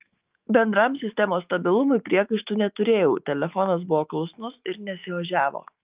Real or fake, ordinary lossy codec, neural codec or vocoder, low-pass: fake; Opus, 24 kbps; autoencoder, 48 kHz, 128 numbers a frame, DAC-VAE, trained on Japanese speech; 3.6 kHz